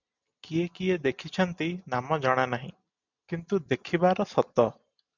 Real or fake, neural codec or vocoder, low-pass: real; none; 7.2 kHz